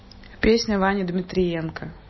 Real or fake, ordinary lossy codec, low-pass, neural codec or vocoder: real; MP3, 24 kbps; 7.2 kHz; none